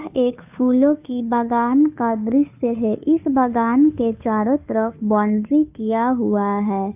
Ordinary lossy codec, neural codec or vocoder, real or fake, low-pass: AAC, 32 kbps; codec, 16 kHz, 2 kbps, FunCodec, trained on Chinese and English, 25 frames a second; fake; 3.6 kHz